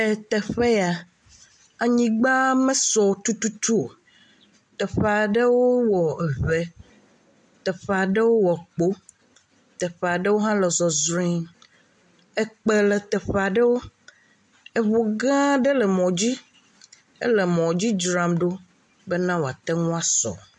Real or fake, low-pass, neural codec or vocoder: real; 10.8 kHz; none